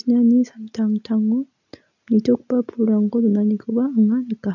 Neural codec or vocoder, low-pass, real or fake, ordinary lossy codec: none; 7.2 kHz; real; none